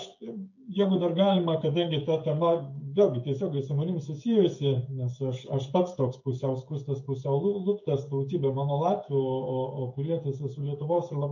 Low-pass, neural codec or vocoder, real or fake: 7.2 kHz; codec, 16 kHz, 16 kbps, FreqCodec, smaller model; fake